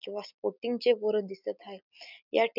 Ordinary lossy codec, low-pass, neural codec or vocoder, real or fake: none; 5.4 kHz; none; real